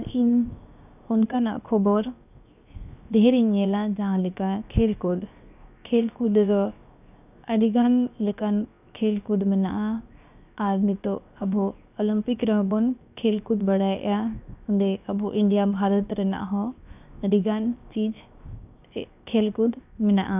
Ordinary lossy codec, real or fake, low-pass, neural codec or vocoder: none; fake; 3.6 kHz; codec, 16 kHz, 0.7 kbps, FocalCodec